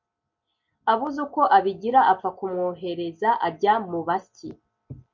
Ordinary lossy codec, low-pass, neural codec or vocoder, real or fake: MP3, 48 kbps; 7.2 kHz; none; real